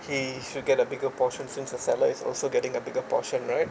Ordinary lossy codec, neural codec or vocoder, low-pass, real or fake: none; none; none; real